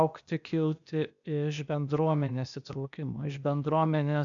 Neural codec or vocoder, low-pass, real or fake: codec, 16 kHz, 0.8 kbps, ZipCodec; 7.2 kHz; fake